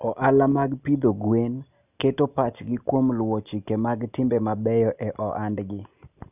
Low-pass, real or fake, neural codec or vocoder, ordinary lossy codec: 3.6 kHz; real; none; none